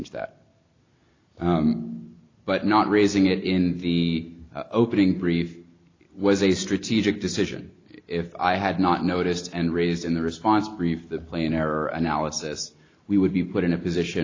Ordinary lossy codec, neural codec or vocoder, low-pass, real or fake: AAC, 32 kbps; none; 7.2 kHz; real